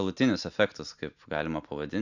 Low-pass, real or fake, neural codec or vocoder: 7.2 kHz; real; none